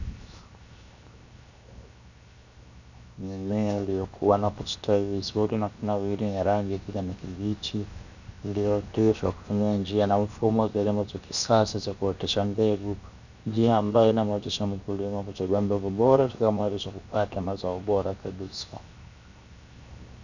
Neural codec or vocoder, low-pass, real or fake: codec, 16 kHz, 0.7 kbps, FocalCodec; 7.2 kHz; fake